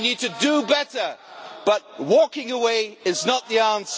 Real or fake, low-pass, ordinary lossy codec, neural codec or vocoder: real; none; none; none